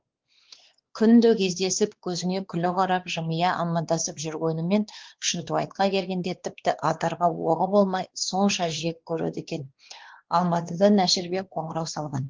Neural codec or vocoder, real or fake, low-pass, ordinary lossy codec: codec, 16 kHz, 2 kbps, X-Codec, WavLM features, trained on Multilingual LibriSpeech; fake; 7.2 kHz; Opus, 16 kbps